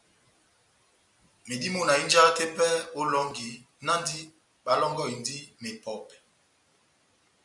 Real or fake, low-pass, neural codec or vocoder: real; 10.8 kHz; none